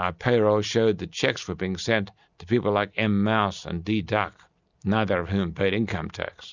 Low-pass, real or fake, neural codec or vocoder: 7.2 kHz; real; none